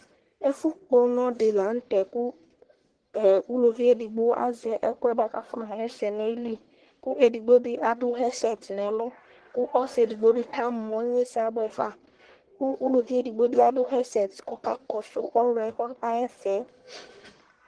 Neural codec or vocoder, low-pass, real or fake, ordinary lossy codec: codec, 44.1 kHz, 1.7 kbps, Pupu-Codec; 9.9 kHz; fake; Opus, 16 kbps